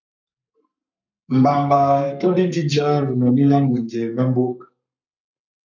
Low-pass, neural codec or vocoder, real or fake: 7.2 kHz; codec, 32 kHz, 1.9 kbps, SNAC; fake